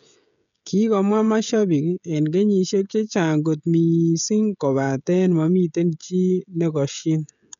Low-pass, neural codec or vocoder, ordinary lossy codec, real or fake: 7.2 kHz; codec, 16 kHz, 16 kbps, FreqCodec, smaller model; none; fake